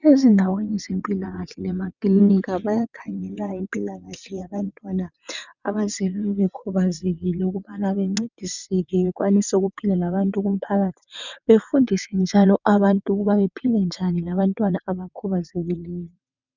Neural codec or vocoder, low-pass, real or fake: vocoder, 22.05 kHz, 80 mel bands, WaveNeXt; 7.2 kHz; fake